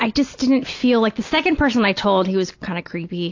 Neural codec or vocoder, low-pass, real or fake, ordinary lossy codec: none; 7.2 kHz; real; AAC, 48 kbps